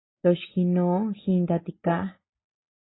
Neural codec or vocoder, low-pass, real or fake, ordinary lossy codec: none; 7.2 kHz; real; AAC, 16 kbps